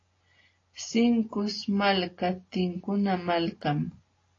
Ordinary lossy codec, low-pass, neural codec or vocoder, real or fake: AAC, 32 kbps; 7.2 kHz; none; real